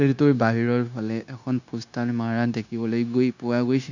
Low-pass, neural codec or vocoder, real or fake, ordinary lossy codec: 7.2 kHz; codec, 16 kHz, 0.9 kbps, LongCat-Audio-Codec; fake; none